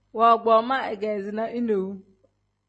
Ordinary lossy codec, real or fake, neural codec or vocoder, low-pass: MP3, 32 kbps; real; none; 10.8 kHz